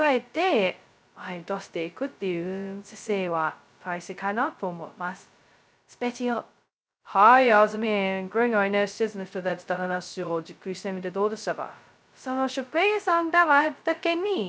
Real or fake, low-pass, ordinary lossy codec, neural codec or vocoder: fake; none; none; codec, 16 kHz, 0.2 kbps, FocalCodec